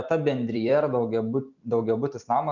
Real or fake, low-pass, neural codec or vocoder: fake; 7.2 kHz; vocoder, 44.1 kHz, 128 mel bands every 256 samples, BigVGAN v2